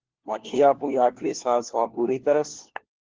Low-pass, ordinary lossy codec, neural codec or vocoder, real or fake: 7.2 kHz; Opus, 16 kbps; codec, 16 kHz, 1 kbps, FunCodec, trained on LibriTTS, 50 frames a second; fake